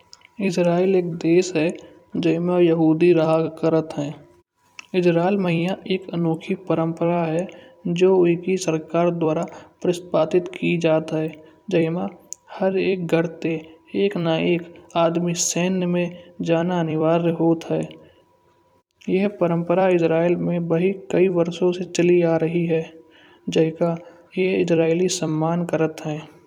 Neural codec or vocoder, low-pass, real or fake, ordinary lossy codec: vocoder, 44.1 kHz, 128 mel bands every 256 samples, BigVGAN v2; 19.8 kHz; fake; none